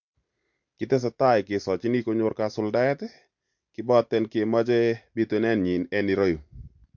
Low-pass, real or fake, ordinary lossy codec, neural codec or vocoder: 7.2 kHz; real; MP3, 48 kbps; none